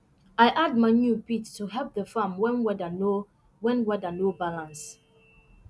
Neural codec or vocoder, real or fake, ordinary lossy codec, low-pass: none; real; none; none